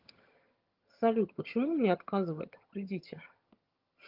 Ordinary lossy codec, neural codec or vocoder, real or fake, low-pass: Opus, 32 kbps; vocoder, 22.05 kHz, 80 mel bands, HiFi-GAN; fake; 5.4 kHz